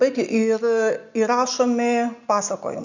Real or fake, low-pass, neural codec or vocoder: real; 7.2 kHz; none